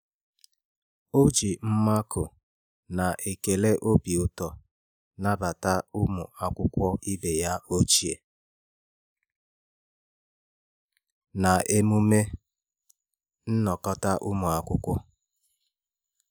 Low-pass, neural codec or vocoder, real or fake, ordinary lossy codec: none; none; real; none